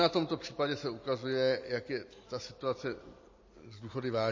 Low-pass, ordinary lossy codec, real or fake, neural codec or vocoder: 7.2 kHz; MP3, 32 kbps; real; none